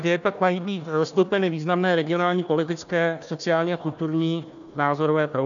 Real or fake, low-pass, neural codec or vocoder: fake; 7.2 kHz; codec, 16 kHz, 1 kbps, FunCodec, trained on Chinese and English, 50 frames a second